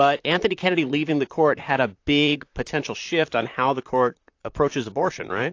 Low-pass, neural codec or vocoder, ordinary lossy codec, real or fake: 7.2 kHz; vocoder, 44.1 kHz, 128 mel bands, Pupu-Vocoder; AAC, 48 kbps; fake